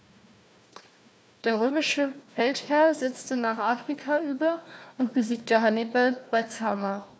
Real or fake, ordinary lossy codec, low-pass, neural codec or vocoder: fake; none; none; codec, 16 kHz, 1 kbps, FunCodec, trained on Chinese and English, 50 frames a second